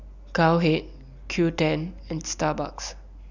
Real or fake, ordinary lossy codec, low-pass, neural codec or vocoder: real; none; 7.2 kHz; none